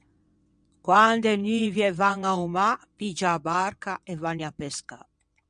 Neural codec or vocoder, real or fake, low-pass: vocoder, 22.05 kHz, 80 mel bands, WaveNeXt; fake; 9.9 kHz